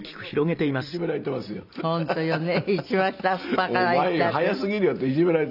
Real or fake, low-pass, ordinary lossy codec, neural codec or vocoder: real; 5.4 kHz; none; none